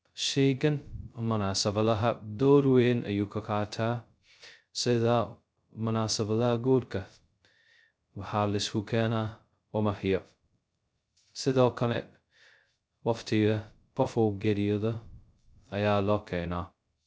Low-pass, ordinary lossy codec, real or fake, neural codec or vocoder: none; none; fake; codec, 16 kHz, 0.2 kbps, FocalCodec